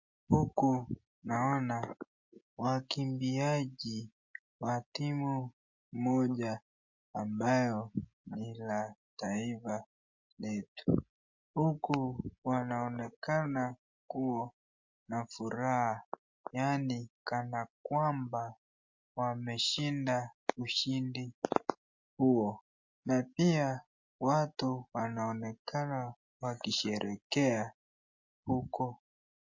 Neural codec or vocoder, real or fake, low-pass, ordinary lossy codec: none; real; 7.2 kHz; MP3, 48 kbps